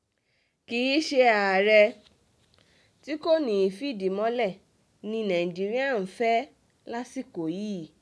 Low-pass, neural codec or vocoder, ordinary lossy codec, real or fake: none; none; none; real